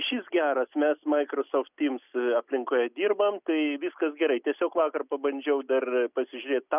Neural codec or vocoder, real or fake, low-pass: none; real; 3.6 kHz